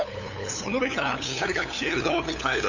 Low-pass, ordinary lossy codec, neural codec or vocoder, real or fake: 7.2 kHz; none; codec, 16 kHz, 8 kbps, FunCodec, trained on LibriTTS, 25 frames a second; fake